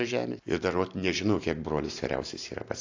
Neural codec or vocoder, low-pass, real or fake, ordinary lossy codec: none; 7.2 kHz; real; AAC, 48 kbps